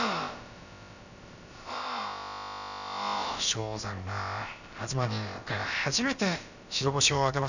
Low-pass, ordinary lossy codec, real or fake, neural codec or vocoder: 7.2 kHz; none; fake; codec, 16 kHz, about 1 kbps, DyCAST, with the encoder's durations